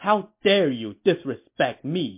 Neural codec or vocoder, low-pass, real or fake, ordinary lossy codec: none; 3.6 kHz; real; MP3, 24 kbps